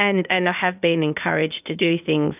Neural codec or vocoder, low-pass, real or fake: codec, 16 kHz, 0.8 kbps, ZipCodec; 3.6 kHz; fake